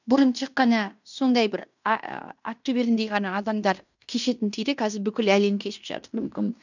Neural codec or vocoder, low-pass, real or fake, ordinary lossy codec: codec, 16 kHz in and 24 kHz out, 0.9 kbps, LongCat-Audio-Codec, fine tuned four codebook decoder; 7.2 kHz; fake; none